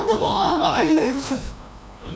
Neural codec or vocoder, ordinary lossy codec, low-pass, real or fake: codec, 16 kHz, 1 kbps, FreqCodec, larger model; none; none; fake